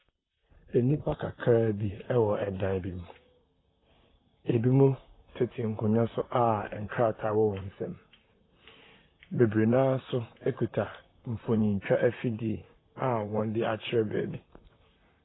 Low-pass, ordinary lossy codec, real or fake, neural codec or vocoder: 7.2 kHz; AAC, 16 kbps; fake; vocoder, 44.1 kHz, 128 mel bands, Pupu-Vocoder